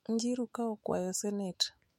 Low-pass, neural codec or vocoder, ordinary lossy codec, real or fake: 19.8 kHz; autoencoder, 48 kHz, 128 numbers a frame, DAC-VAE, trained on Japanese speech; MP3, 64 kbps; fake